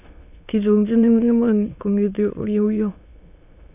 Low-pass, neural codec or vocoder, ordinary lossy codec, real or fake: 3.6 kHz; autoencoder, 22.05 kHz, a latent of 192 numbers a frame, VITS, trained on many speakers; none; fake